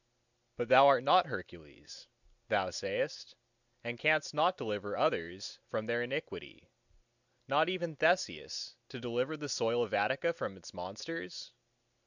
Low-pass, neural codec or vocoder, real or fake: 7.2 kHz; none; real